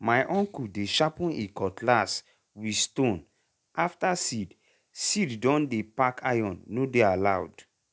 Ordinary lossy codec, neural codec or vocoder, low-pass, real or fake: none; none; none; real